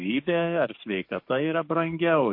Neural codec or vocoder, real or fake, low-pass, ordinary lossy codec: codec, 44.1 kHz, 7.8 kbps, DAC; fake; 5.4 kHz; MP3, 32 kbps